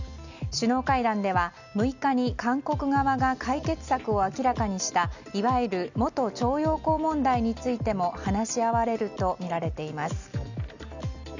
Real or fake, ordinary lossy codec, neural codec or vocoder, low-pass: real; none; none; 7.2 kHz